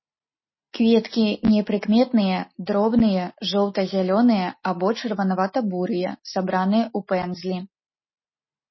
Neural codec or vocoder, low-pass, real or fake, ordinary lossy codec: none; 7.2 kHz; real; MP3, 24 kbps